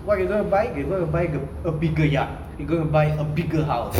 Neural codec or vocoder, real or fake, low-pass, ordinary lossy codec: none; real; 19.8 kHz; none